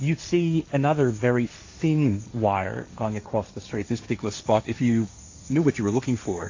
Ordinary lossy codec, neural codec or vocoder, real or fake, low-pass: AAC, 48 kbps; codec, 16 kHz, 1.1 kbps, Voila-Tokenizer; fake; 7.2 kHz